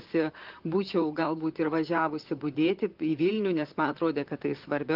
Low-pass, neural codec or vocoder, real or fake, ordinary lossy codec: 5.4 kHz; vocoder, 44.1 kHz, 128 mel bands, Pupu-Vocoder; fake; Opus, 32 kbps